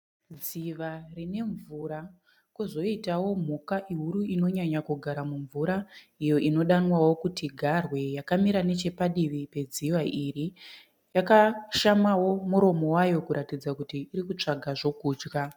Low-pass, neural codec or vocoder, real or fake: 19.8 kHz; none; real